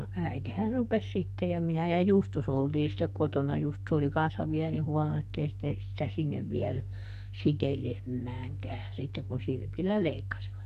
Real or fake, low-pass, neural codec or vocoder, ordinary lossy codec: fake; 14.4 kHz; codec, 44.1 kHz, 2.6 kbps, SNAC; none